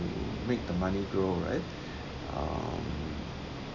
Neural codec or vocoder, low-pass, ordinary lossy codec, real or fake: none; 7.2 kHz; none; real